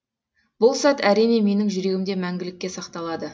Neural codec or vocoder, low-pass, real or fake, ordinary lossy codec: none; none; real; none